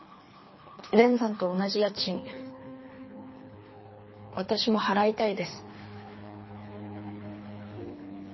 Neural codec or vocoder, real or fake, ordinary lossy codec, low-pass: codec, 24 kHz, 3 kbps, HILCodec; fake; MP3, 24 kbps; 7.2 kHz